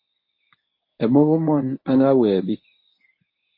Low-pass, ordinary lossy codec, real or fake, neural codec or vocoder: 5.4 kHz; MP3, 24 kbps; fake; codec, 24 kHz, 0.9 kbps, WavTokenizer, medium speech release version 1